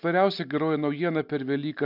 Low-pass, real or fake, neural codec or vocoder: 5.4 kHz; real; none